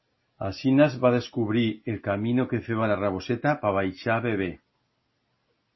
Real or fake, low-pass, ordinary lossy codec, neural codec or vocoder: real; 7.2 kHz; MP3, 24 kbps; none